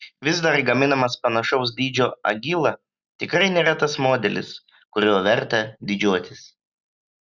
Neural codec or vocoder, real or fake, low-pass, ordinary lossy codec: none; real; 7.2 kHz; Opus, 64 kbps